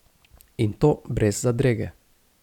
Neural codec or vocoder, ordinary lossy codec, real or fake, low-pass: none; none; real; 19.8 kHz